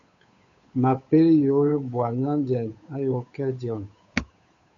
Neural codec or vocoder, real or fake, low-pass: codec, 16 kHz, 8 kbps, FunCodec, trained on Chinese and English, 25 frames a second; fake; 7.2 kHz